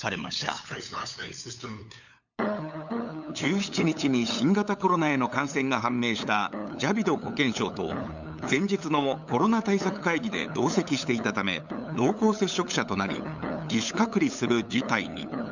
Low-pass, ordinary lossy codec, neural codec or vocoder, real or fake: 7.2 kHz; none; codec, 16 kHz, 8 kbps, FunCodec, trained on LibriTTS, 25 frames a second; fake